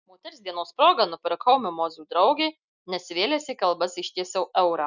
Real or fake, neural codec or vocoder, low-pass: real; none; 7.2 kHz